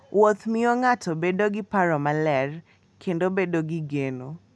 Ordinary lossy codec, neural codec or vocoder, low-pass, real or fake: none; none; none; real